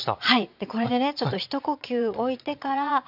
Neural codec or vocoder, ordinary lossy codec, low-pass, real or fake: vocoder, 22.05 kHz, 80 mel bands, Vocos; none; 5.4 kHz; fake